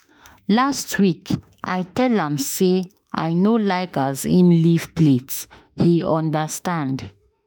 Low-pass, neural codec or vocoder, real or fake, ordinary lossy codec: none; autoencoder, 48 kHz, 32 numbers a frame, DAC-VAE, trained on Japanese speech; fake; none